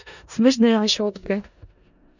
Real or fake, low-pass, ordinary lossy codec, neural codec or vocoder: fake; 7.2 kHz; none; codec, 16 kHz in and 24 kHz out, 0.4 kbps, LongCat-Audio-Codec, four codebook decoder